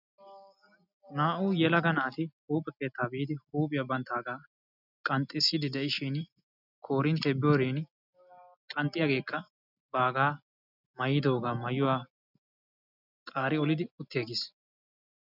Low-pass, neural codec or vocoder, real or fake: 5.4 kHz; none; real